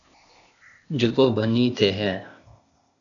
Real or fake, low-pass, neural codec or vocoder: fake; 7.2 kHz; codec, 16 kHz, 0.8 kbps, ZipCodec